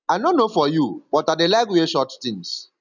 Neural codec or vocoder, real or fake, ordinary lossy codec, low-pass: none; real; none; 7.2 kHz